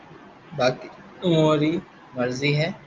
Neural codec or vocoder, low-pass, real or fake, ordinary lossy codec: none; 7.2 kHz; real; Opus, 32 kbps